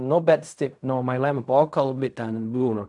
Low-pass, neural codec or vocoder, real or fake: 10.8 kHz; codec, 16 kHz in and 24 kHz out, 0.4 kbps, LongCat-Audio-Codec, fine tuned four codebook decoder; fake